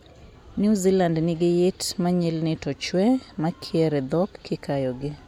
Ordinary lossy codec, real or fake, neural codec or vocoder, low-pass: MP3, 96 kbps; real; none; 19.8 kHz